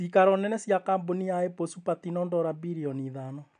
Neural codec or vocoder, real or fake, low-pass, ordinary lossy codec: none; real; 9.9 kHz; none